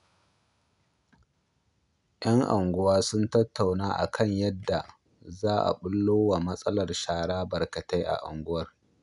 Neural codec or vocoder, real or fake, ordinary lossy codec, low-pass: none; real; none; 10.8 kHz